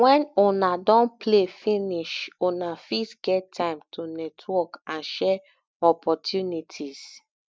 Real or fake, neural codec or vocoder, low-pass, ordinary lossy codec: real; none; none; none